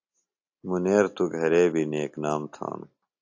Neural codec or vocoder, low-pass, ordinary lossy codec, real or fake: none; 7.2 kHz; AAC, 48 kbps; real